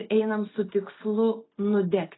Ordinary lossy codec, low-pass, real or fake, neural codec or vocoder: AAC, 16 kbps; 7.2 kHz; real; none